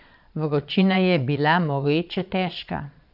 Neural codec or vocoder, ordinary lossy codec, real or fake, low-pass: vocoder, 22.05 kHz, 80 mel bands, Vocos; none; fake; 5.4 kHz